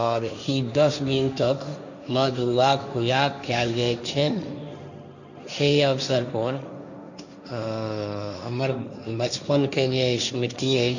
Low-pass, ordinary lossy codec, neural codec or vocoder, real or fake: 7.2 kHz; none; codec, 16 kHz, 1.1 kbps, Voila-Tokenizer; fake